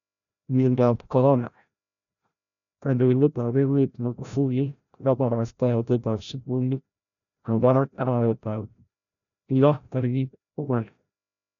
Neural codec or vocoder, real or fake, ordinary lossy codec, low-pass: codec, 16 kHz, 0.5 kbps, FreqCodec, larger model; fake; none; 7.2 kHz